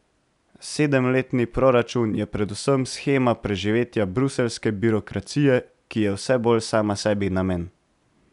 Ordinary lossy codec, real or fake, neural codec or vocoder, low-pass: none; real; none; 10.8 kHz